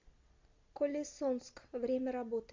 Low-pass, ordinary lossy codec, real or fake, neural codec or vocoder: 7.2 kHz; MP3, 48 kbps; real; none